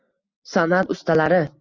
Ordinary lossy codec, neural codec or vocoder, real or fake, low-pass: Opus, 64 kbps; none; real; 7.2 kHz